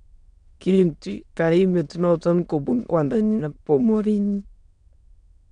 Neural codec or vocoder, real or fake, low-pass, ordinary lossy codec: autoencoder, 22.05 kHz, a latent of 192 numbers a frame, VITS, trained on many speakers; fake; 9.9 kHz; MP3, 64 kbps